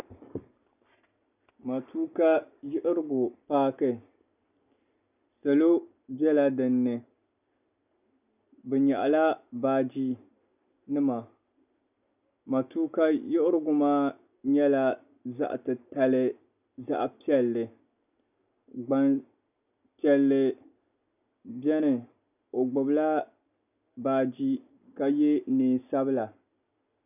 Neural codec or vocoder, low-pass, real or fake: none; 3.6 kHz; real